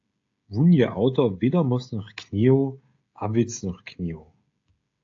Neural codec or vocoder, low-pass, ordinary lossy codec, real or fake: codec, 16 kHz, 16 kbps, FreqCodec, smaller model; 7.2 kHz; AAC, 48 kbps; fake